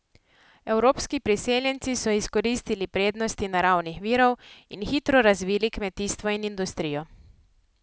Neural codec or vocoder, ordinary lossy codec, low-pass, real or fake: none; none; none; real